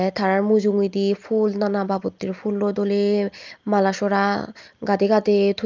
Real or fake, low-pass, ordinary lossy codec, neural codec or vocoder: real; 7.2 kHz; Opus, 24 kbps; none